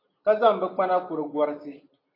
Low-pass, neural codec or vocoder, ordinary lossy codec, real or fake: 5.4 kHz; none; AAC, 32 kbps; real